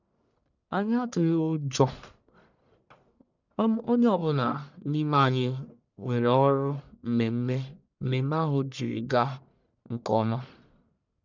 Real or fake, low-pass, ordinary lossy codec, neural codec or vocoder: fake; 7.2 kHz; none; codec, 44.1 kHz, 1.7 kbps, Pupu-Codec